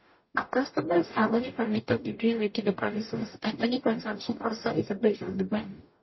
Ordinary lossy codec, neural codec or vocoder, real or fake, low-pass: MP3, 24 kbps; codec, 44.1 kHz, 0.9 kbps, DAC; fake; 7.2 kHz